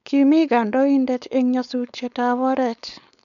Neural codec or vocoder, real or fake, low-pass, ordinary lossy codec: codec, 16 kHz, 4.8 kbps, FACodec; fake; 7.2 kHz; none